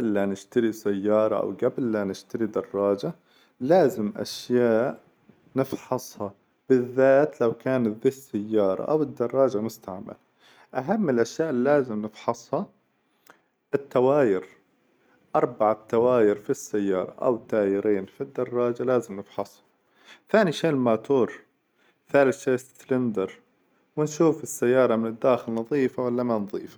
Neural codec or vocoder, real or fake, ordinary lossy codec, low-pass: none; real; none; none